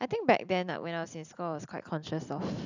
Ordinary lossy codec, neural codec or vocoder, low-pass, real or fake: none; none; 7.2 kHz; real